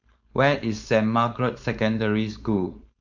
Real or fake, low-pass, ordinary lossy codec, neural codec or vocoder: fake; 7.2 kHz; MP3, 64 kbps; codec, 16 kHz, 4.8 kbps, FACodec